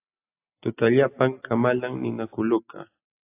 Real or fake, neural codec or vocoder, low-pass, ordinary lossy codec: real; none; 3.6 kHz; AAC, 24 kbps